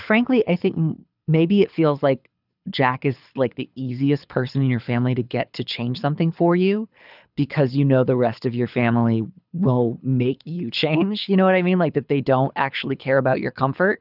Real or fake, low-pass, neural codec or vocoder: fake; 5.4 kHz; codec, 24 kHz, 6 kbps, HILCodec